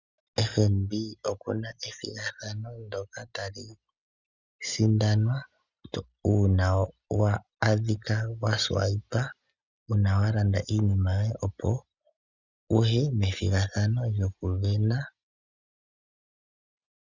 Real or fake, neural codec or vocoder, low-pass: real; none; 7.2 kHz